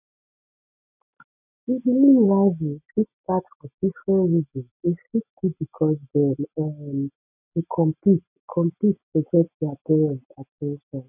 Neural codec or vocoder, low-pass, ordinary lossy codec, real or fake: none; 3.6 kHz; none; real